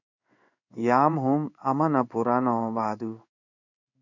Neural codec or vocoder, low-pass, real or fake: codec, 16 kHz in and 24 kHz out, 1 kbps, XY-Tokenizer; 7.2 kHz; fake